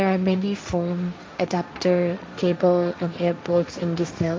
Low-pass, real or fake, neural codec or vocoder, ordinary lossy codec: none; fake; codec, 16 kHz, 1.1 kbps, Voila-Tokenizer; none